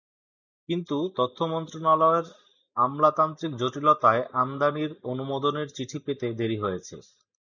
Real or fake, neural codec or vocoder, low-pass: real; none; 7.2 kHz